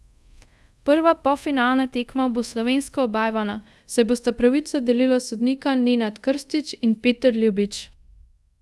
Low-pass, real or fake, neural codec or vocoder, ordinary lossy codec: none; fake; codec, 24 kHz, 0.5 kbps, DualCodec; none